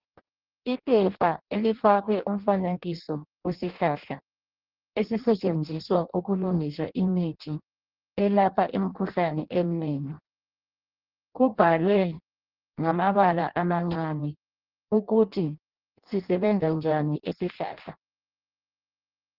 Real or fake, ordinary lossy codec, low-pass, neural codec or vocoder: fake; Opus, 16 kbps; 5.4 kHz; codec, 16 kHz in and 24 kHz out, 0.6 kbps, FireRedTTS-2 codec